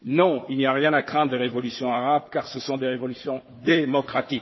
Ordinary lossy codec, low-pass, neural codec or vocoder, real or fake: MP3, 24 kbps; 7.2 kHz; codec, 16 kHz, 4 kbps, FunCodec, trained on Chinese and English, 50 frames a second; fake